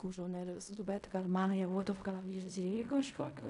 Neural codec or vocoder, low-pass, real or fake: codec, 16 kHz in and 24 kHz out, 0.4 kbps, LongCat-Audio-Codec, fine tuned four codebook decoder; 10.8 kHz; fake